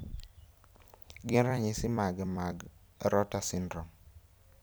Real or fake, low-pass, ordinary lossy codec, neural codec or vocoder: fake; none; none; vocoder, 44.1 kHz, 128 mel bands every 256 samples, BigVGAN v2